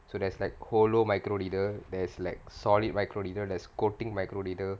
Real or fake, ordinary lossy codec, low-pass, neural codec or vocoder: real; none; none; none